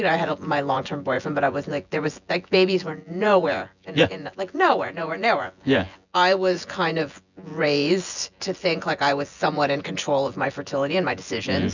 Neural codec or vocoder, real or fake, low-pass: vocoder, 24 kHz, 100 mel bands, Vocos; fake; 7.2 kHz